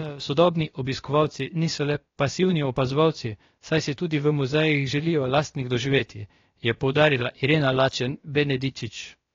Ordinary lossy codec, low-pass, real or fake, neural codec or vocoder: AAC, 32 kbps; 7.2 kHz; fake; codec, 16 kHz, about 1 kbps, DyCAST, with the encoder's durations